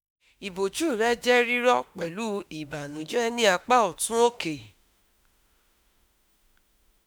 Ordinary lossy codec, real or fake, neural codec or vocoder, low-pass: none; fake; autoencoder, 48 kHz, 32 numbers a frame, DAC-VAE, trained on Japanese speech; none